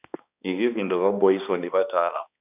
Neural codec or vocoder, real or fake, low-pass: codec, 16 kHz, 2 kbps, X-Codec, HuBERT features, trained on balanced general audio; fake; 3.6 kHz